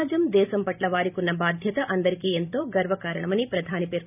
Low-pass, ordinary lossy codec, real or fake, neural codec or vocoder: 3.6 kHz; none; fake; vocoder, 44.1 kHz, 128 mel bands every 512 samples, BigVGAN v2